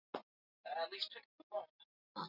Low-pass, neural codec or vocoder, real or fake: 5.4 kHz; none; real